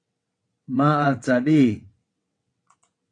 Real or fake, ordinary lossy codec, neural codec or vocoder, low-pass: fake; AAC, 48 kbps; vocoder, 22.05 kHz, 80 mel bands, WaveNeXt; 9.9 kHz